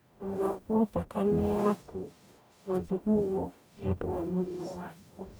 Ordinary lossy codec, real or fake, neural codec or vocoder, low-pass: none; fake; codec, 44.1 kHz, 0.9 kbps, DAC; none